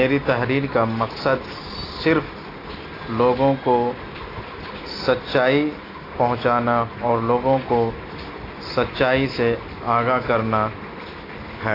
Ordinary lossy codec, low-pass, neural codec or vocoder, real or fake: AAC, 24 kbps; 5.4 kHz; none; real